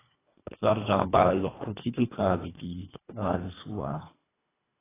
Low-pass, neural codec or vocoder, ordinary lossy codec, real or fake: 3.6 kHz; codec, 24 kHz, 1.5 kbps, HILCodec; AAC, 16 kbps; fake